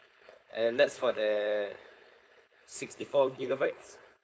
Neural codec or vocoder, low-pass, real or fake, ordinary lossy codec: codec, 16 kHz, 4.8 kbps, FACodec; none; fake; none